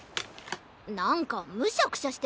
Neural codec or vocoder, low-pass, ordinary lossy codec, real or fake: none; none; none; real